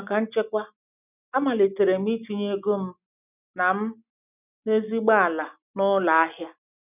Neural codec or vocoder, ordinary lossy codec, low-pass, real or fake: none; none; 3.6 kHz; real